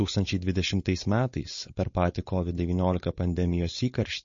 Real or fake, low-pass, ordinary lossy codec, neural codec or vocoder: fake; 7.2 kHz; MP3, 32 kbps; codec, 16 kHz, 4.8 kbps, FACodec